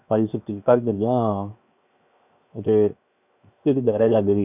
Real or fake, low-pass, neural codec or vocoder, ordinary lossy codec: fake; 3.6 kHz; codec, 16 kHz, 0.7 kbps, FocalCodec; none